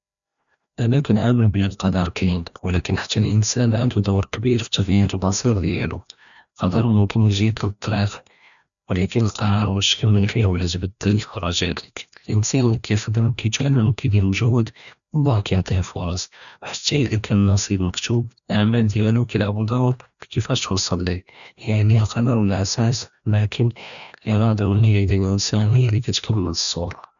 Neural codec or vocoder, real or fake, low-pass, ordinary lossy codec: codec, 16 kHz, 1 kbps, FreqCodec, larger model; fake; 7.2 kHz; none